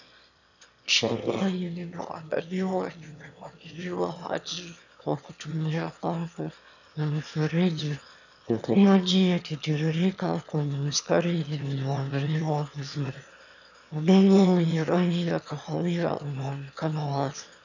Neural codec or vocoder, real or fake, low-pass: autoencoder, 22.05 kHz, a latent of 192 numbers a frame, VITS, trained on one speaker; fake; 7.2 kHz